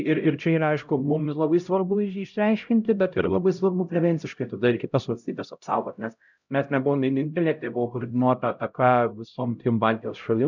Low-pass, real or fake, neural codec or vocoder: 7.2 kHz; fake; codec, 16 kHz, 0.5 kbps, X-Codec, HuBERT features, trained on LibriSpeech